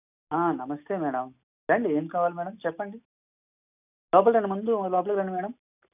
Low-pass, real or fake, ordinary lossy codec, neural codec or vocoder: 3.6 kHz; real; none; none